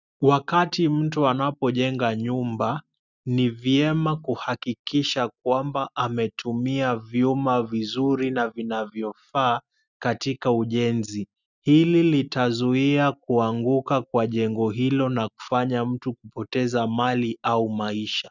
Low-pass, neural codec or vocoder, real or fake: 7.2 kHz; none; real